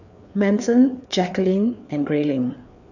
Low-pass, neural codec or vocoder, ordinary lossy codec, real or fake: 7.2 kHz; codec, 16 kHz, 4 kbps, FreqCodec, larger model; none; fake